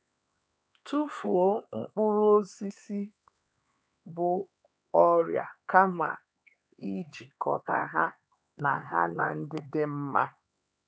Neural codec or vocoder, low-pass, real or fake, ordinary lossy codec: codec, 16 kHz, 4 kbps, X-Codec, HuBERT features, trained on LibriSpeech; none; fake; none